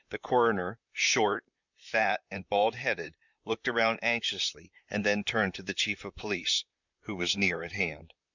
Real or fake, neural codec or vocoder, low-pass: fake; vocoder, 44.1 kHz, 128 mel bands, Pupu-Vocoder; 7.2 kHz